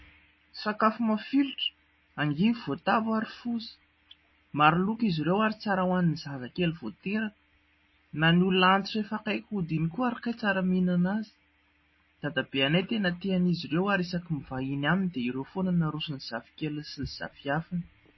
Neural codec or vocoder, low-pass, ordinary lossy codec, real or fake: none; 7.2 kHz; MP3, 24 kbps; real